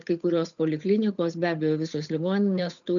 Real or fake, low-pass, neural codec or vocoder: fake; 7.2 kHz; codec, 16 kHz, 8 kbps, FunCodec, trained on Chinese and English, 25 frames a second